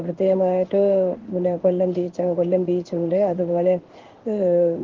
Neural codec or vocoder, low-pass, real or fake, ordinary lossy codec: codec, 16 kHz in and 24 kHz out, 1 kbps, XY-Tokenizer; 7.2 kHz; fake; Opus, 16 kbps